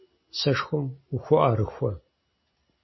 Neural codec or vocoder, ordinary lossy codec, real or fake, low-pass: none; MP3, 24 kbps; real; 7.2 kHz